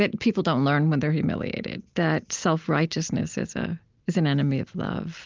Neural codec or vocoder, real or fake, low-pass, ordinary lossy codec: none; real; 7.2 kHz; Opus, 32 kbps